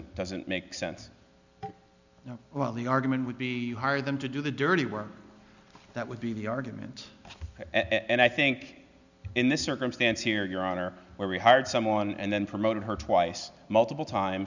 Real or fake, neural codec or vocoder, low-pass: real; none; 7.2 kHz